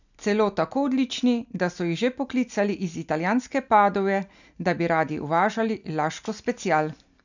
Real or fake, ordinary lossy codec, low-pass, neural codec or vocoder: real; none; 7.2 kHz; none